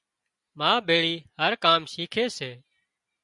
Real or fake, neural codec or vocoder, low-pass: real; none; 10.8 kHz